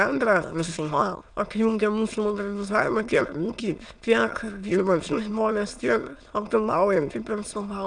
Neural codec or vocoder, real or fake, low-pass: autoencoder, 22.05 kHz, a latent of 192 numbers a frame, VITS, trained on many speakers; fake; 9.9 kHz